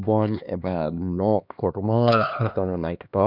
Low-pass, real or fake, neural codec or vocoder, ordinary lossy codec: 5.4 kHz; fake; codec, 16 kHz, 2 kbps, X-Codec, HuBERT features, trained on LibriSpeech; none